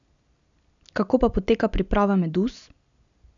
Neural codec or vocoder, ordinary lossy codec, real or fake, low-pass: none; none; real; 7.2 kHz